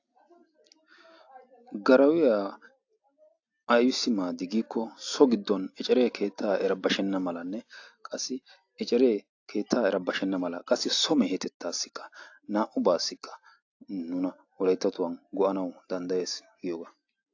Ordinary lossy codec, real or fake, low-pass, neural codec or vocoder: AAC, 48 kbps; real; 7.2 kHz; none